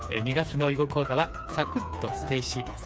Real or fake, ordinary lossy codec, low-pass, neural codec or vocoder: fake; none; none; codec, 16 kHz, 4 kbps, FreqCodec, smaller model